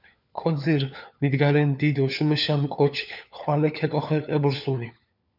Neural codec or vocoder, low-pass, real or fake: codec, 16 kHz, 4 kbps, FunCodec, trained on LibriTTS, 50 frames a second; 5.4 kHz; fake